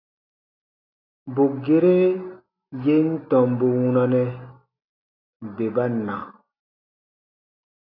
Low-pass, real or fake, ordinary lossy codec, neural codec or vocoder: 5.4 kHz; real; AAC, 32 kbps; none